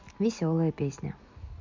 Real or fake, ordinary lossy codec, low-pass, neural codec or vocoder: real; MP3, 48 kbps; 7.2 kHz; none